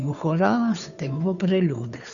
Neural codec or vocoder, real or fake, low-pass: codec, 16 kHz, 2 kbps, FunCodec, trained on Chinese and English, 25 frames a second; fake; 7.2 kHz